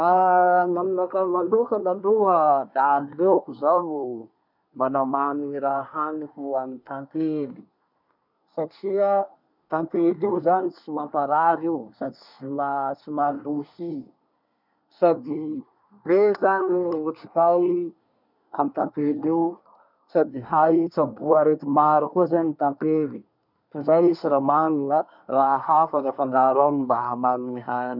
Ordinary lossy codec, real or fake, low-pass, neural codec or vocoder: none; fake; 5.4 kHz; codec, 24 kHz, 1 kbps, SNAC